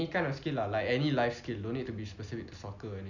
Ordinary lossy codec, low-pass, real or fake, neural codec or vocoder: none; 7.2 kHz; real; none